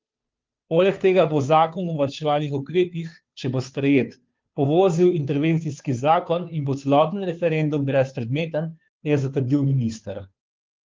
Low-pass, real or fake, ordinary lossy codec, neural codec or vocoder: 7.2 kHz; fake; Opus, 32 kbps; codec, 16 kHz, 2 kbps, FunCodec, trained on Chinese and English, 25 frames a second